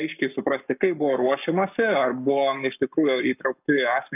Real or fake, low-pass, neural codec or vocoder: fake; 3.6 kHz; codec, 44.1 kHz, 7.8 kbps, Pupu-Codec